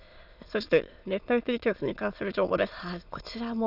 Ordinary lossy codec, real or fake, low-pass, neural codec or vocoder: none; fake; 5.4 kHz; autoencoder, 22.05 kHz, a latent of 192 numbers a frame, VITS, trained on many speakers